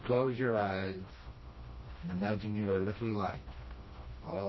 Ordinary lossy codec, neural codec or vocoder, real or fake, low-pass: MP3, 24 kbps; codec, 16 kHz, 1 kbps, FreqCodec, smaller model; fake; 7.2 kHz